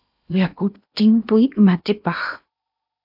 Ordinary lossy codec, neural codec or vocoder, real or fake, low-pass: AAC, 48 kbps; codec, 16 kHz in and 24 kHz out, 0.8 kbps, FocalCodec, streaming, 65536 codes; fake; 5.4 kHz